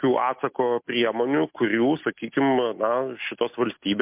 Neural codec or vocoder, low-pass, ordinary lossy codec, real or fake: none; 3.6 kHz; MP3, 32 kbps; real